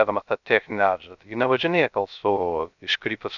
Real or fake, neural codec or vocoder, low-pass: fake; codec, 16 kHz, 0.3 kbps, FocalCodec; 7.2 kHz